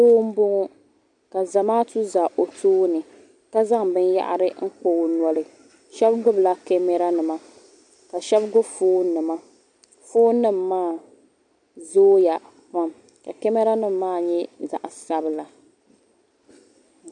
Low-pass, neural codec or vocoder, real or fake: 10.8 kHz; none; real